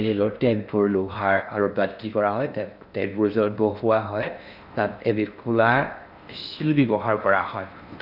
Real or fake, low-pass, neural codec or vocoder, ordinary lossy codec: fake; 5.4 kHz; codec, 16 kHz in and 24 kHz out, 0.6 kbps, FocalCodec, streaming, 4096 codes; none